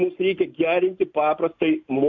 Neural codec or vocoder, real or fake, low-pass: none; real; 7.2 kHz